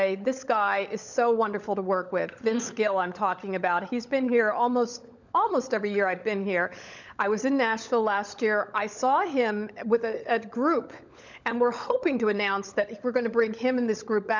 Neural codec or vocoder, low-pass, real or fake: codec, 16 kHz, 16 kbps, FunCodec, trained on LibriTTS, 50 frames a second; 7.2 kHz; fake